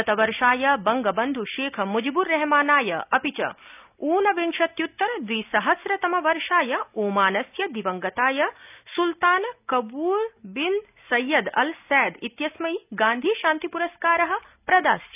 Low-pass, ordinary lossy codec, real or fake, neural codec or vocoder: 3.6 kHz; none; real; none